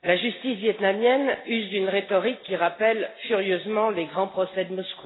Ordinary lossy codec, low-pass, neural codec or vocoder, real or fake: AAC, 16 kbps; 7.2 kHz; none; real